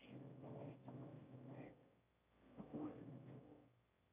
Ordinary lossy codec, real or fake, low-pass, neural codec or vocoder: AAC, 32 kbps; fake; 3.6 kHz; autoencoder, 22.05 kHz, a latent of 192 numbers a frame, VITS, trained on one speaker